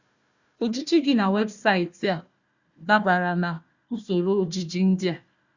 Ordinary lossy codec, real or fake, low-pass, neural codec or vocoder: Opus, 64 kbps; fake; 7.2 kHz; codec, 16 kHz, 1 kbps, FunCodec, trained on Chinese and English, 50 frames a second